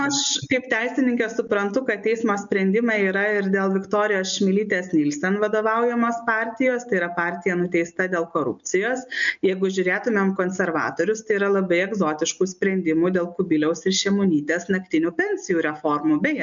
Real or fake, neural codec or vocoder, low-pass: real; none; 7.2 kHz